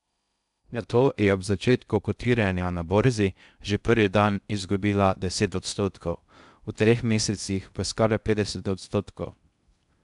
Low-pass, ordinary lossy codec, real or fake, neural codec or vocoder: 10.8 kHz; none; fake; codec, 16 kHz in and 24 kHz out, 0.6 kbps, FocalCodec, streaming, 2048 codes